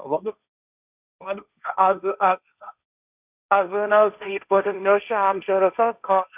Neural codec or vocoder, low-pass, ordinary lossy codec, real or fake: codec, 16 kHz, 1.1 kbps, Voila-Tokenizer; 3.6 kHz; none; fake